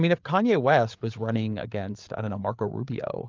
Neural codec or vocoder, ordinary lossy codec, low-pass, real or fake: codec, 16 kHz, 8 kbps, FreqCodec, larger model; Opus, 24 kbps; 7.2 kHz; fake